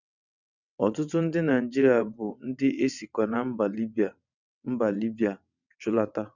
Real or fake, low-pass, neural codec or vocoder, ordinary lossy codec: fake; 7.2 kHz; vocoder, 22.05 kHz, 80 mel bands, WaveNeXt; none